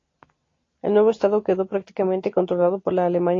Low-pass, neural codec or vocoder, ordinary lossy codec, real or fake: 7.2 kHz; none; AAC, 32 kbps; real